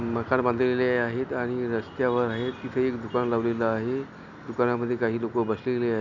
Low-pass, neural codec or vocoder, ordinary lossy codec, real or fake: 7.2 kHz; none; none; real